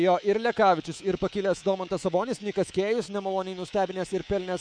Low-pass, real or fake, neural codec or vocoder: 9.9 kHz; fake; codec, 24 kHz, 3.1 kbps, DualCodec